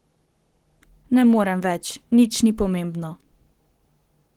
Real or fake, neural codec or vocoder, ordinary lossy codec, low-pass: fake; codec, 44.1 kHz, 7.8 kbps, Pupu-Codec; Opus, 16 kbps; 19.8 kHz